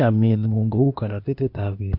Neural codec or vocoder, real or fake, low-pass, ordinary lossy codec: codec, 16 kHz, 0.8 kbps, ZipCodec; fake; 5.4 kHz; MP3, 48 kbps